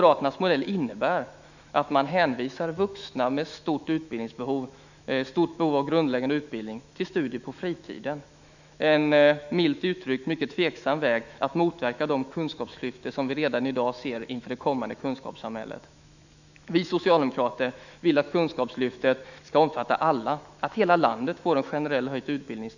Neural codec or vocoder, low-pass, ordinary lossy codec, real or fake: autoencoder, 48 kHz, 128 numbers a frame, DAC-VAE, trained on Japanese speech; 7.2 kHz; none; fake